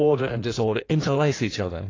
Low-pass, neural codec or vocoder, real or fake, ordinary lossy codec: 7.2 kHz; codec, 16 kHz in and 24 kHz out, 1.1 kbps, FireRedTTS-2 codec; fake; AAC, 32 kbps